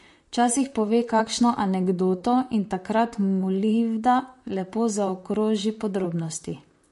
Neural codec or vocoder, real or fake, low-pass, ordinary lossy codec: vocoder, 44.1 kHz, 128 mel bands, Pupu-Vocoder; fake; 14.4 kHz; MP3, 48 kbps